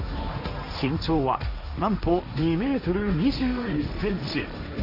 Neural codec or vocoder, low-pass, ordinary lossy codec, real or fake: codec, 16 kHz, 1.1 kbps, Voila-Tokenizer; 5.4 kHz; none; fake